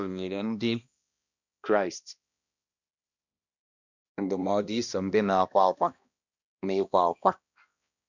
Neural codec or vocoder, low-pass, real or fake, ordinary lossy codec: codec, 16 kHz, 1 kbps, X-Codec, HuBERT features, trained on balanced general audio; 7.2 kHz; fake; none